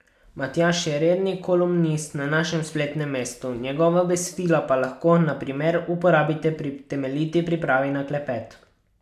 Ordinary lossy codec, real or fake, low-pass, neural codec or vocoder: none; real; 14.4 kHz; none